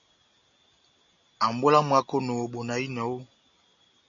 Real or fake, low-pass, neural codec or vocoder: real; 7.2 kHz; none